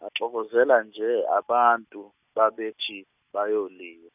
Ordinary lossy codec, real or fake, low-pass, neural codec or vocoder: none; real; 3.6 kHz; none